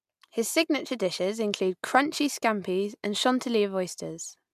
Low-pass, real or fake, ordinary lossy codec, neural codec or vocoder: 14.4 kHz; real; MP3, 96 kbps; none